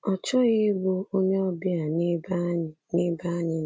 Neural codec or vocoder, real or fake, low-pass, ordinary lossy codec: none; real; none; none